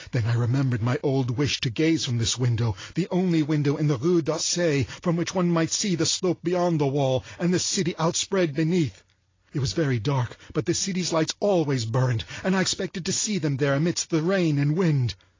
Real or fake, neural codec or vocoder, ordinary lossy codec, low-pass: real; none; AAC, 32 kbps; 7.2 kHz